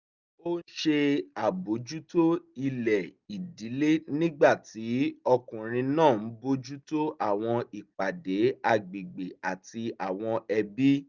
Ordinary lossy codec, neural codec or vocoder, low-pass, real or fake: Opus, 64 kbps; none; 7.2 kHz; real